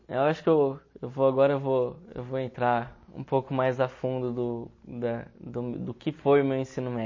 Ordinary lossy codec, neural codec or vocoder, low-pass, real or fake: MP3, 32 kbps; none; 7.2 kHz; real